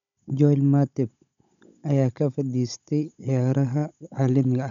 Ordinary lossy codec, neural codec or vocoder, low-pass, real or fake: none; codec, 16 kHz, 16 kbps, FunCodec, trained on Chinese and English, 50 frames a second; 7.2 kHz; fake